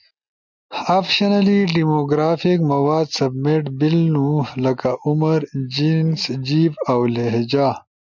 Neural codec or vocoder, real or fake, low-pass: none; real; 7.2 kHz